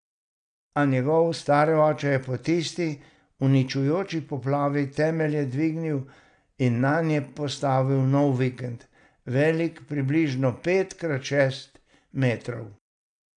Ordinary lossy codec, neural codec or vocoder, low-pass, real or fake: none; none; 9.9 kHz; real